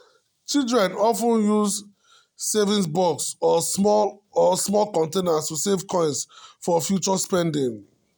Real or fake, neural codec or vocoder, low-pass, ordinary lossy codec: real; none; none; none